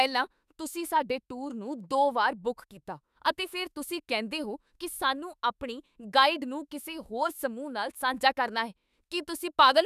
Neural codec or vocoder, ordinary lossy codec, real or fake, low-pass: autoencoder, 48 kHz, 32 numbers a frame, DAC-VAE, trained on Japanese speech; none; fake; 14.4 kHz